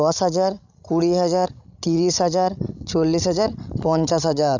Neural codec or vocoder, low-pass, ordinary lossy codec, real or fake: codec, 16 kHz, 16 kbps, FreqCodec, larger model; 7.2 kHz; none; fake